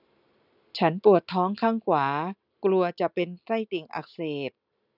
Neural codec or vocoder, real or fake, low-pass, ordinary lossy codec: none; real; 5.4 kHz; none